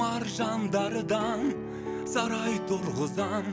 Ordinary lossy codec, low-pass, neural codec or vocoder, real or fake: none; none; none; real